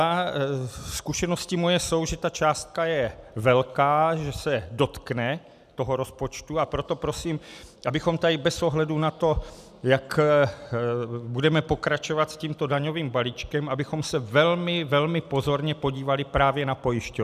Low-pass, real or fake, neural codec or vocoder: 14.4 kHz; real; none